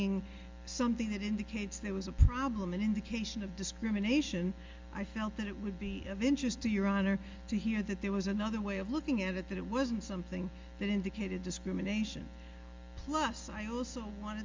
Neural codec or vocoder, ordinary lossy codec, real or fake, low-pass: none; Opus, 32 kbps; real; 7.2 kHz